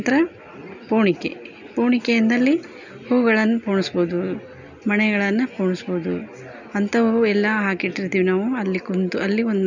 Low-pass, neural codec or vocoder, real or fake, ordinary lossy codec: 7.2 kHz; none; real; none